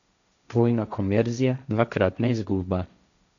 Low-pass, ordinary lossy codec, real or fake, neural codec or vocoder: 7.2 kHz; none; fake; codec, 16 kHz, 1.1 kbps, Voila-Tokenizer